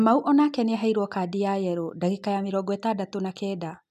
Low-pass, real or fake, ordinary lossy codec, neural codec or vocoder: 14.4 kHz; real; none; none